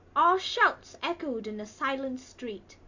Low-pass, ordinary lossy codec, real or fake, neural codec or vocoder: 7.2 kHz; AAC, 48 kbps; real; none